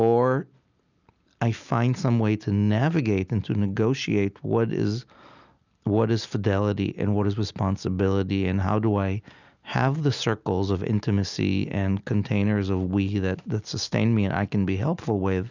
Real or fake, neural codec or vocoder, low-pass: real; none; 7.2 kHz